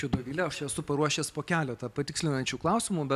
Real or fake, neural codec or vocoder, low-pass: real; none; 14.4 kHz